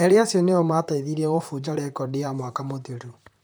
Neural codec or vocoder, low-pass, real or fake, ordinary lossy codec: vocoder, 44.1 kHz, 128 mel bands, Pupu-Vocoder; none; fake; none